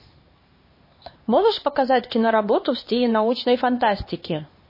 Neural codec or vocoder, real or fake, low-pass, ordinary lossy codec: codec, 16 kHz, 2 kbps, X-Codec, HuBERT features, trained on LibriSpeech; fake; 5.4 kHz; MP3, 24 kbps